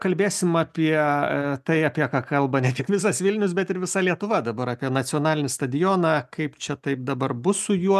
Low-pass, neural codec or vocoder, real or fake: 14.4 kHz; none; real